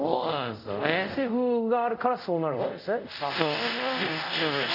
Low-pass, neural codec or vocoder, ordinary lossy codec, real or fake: 5.4 kHz; codec, 24 kHz, 0.5 kbps, DualCodec; none; fake